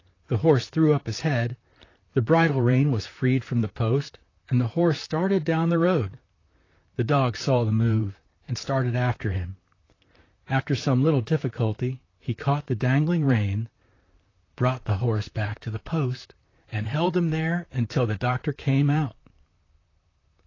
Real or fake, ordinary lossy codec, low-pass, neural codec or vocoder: fake; AAC, 32 kbps; 7.2 kHz; vocoder, 44.1 kHz, 128 mel bands, Pupu-Vocoder